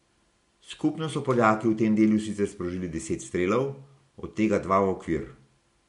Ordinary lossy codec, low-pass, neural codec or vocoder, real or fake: MP3, 64 kbps; 10.8 kHz; none; real